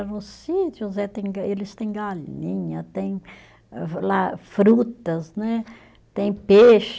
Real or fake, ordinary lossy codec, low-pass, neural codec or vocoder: real; none; none; none